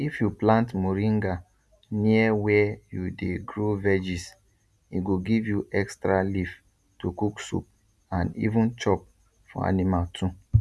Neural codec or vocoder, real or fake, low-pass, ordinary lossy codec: none; real; none; none